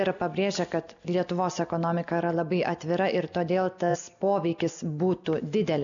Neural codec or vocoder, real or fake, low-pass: none; real; 7.2 kHz